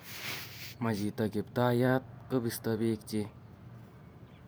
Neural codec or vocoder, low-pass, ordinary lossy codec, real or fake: vocoder, 44.1 kHz, 128 mel bands every 512 samples, BigVGAN v2; none; none; fake